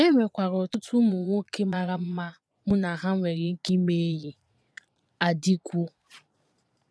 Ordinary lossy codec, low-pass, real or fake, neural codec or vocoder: none; none; fake; vocoder, 22.05 kHz, 80 mel bands, Vocos